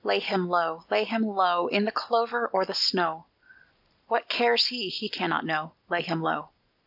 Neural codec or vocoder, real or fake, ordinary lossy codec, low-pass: none; real; AAC, 48 kbps; 5.4 kHz